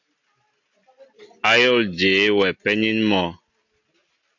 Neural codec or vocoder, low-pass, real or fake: none; 7.2 kHz; real